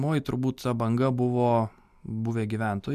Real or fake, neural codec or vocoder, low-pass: real; none; 14.4 kHz